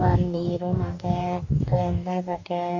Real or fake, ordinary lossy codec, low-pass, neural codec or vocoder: fake; none; 7.2 kHz; codec, 44.1 kHz, 2.6 kbps, DAC